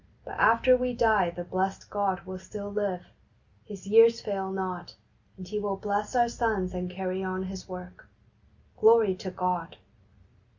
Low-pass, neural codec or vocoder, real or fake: 7.2 kHz; none; real